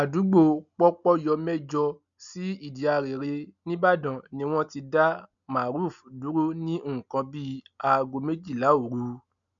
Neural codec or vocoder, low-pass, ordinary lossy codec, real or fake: none; 7.2 kHz; none; real